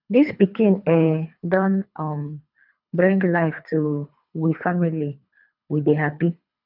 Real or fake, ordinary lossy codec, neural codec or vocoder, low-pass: fake; none; codec, 24 kHz, 3 kbps, HILCodec; 5.4 kHz